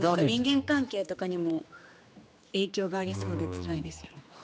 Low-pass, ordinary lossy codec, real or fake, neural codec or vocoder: none; none; fake; codec, 16 kHz, 2 kbps, X-Codec, HuBERT features, trained on general audio